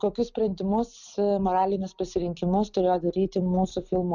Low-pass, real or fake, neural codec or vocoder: 7.2 kHz; real; none